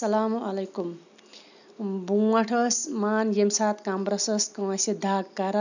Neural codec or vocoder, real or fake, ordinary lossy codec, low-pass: none; real; none; 7.2 kHz